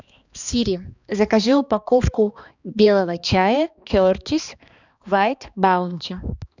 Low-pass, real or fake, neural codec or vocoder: 7.2 kHz; fake; codec, 16 kHz, 2 kbps, X-Codec, HuBERT features, trained on balanced general audio